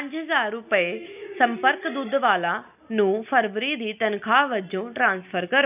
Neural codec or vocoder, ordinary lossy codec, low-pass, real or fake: none; none; 3.6 kHz; real